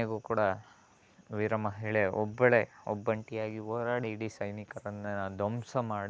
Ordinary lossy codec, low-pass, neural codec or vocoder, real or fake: none; none; none; real